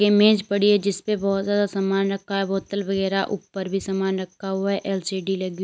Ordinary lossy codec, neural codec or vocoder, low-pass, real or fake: none; none; none; real